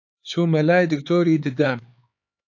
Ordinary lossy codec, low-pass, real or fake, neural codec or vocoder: AAC, 48 kbps; 7.2 kHz; fake; codec, 16 kHz, 4 kbps, X-Codec, HuBERT features, trained on LibriSpeech